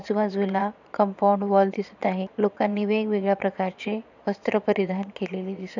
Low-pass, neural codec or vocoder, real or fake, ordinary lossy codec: 7.2 kHz; vocoder, 22.05 kHz, 80 mel bands, Vocos; fake; none